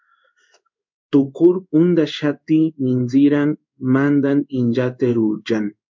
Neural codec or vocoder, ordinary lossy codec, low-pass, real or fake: codec, 16 kHz in and 24 kHz out, 1 kbps, XY-Tokenizer; MP3, 64 kbps; 7.2 kHz; fake